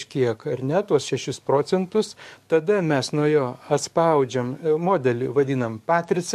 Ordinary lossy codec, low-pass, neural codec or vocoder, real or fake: MP3, 64 kbps; 14.4 kHz; codec, 44.1 kHz, 7.8 kbps, DAC; fake